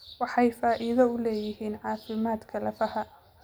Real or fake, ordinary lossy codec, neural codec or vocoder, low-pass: real; none; none; none